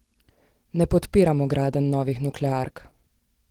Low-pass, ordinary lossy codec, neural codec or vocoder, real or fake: 19.8 kHz; Opus, 16 kbps; none; real